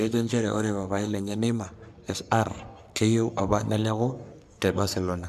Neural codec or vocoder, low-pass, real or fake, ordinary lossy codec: codec, 44.1 kHz, 3.4 kbps, Pupu-Codec; 14.4 kHz; fake; none